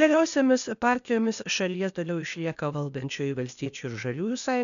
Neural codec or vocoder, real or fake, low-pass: codec, 16 kHz, 0.8 kbps, ZipCodec; fake; 7.2 kHz